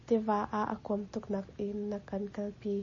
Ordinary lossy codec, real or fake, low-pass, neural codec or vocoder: MP3, 32 kbps; real; 7.2 kHz; none